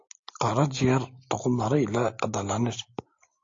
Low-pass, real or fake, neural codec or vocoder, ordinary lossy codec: 7.2 kHz; real; none; AAC, 48 kbps